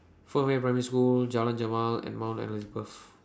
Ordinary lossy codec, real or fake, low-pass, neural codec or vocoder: none; real; none; none